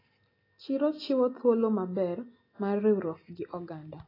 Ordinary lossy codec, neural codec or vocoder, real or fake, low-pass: AAC, 24 kbps; none; real; 5.4 kHz